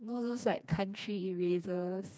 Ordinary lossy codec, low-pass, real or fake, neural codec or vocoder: none; none; fake; codec, 16 kHz, 2 kbps, FreqCodec, smaller model